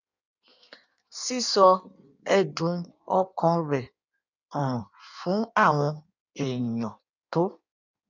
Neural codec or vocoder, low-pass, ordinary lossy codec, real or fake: codec, 16 kHz in and 24 kHz out, 1.1 kbps, FireRedTTS-2 codec; 7.2 kHz; none; fake